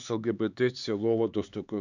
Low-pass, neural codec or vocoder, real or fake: 7.2 kHz; codec, 16 kHz, 4 kbps, X-Codec, HuBERT features, trained on LibriSpeech; fake